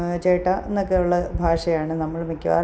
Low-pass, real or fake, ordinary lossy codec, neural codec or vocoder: none; real; none; none